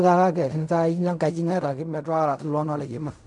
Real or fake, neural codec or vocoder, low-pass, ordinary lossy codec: fake; codec, 16 kHz in and 24 kHz out, 0.4 kbps, LongCat-Audio-Codec, fine tuned four codebook decoder; 10.8 kHz; none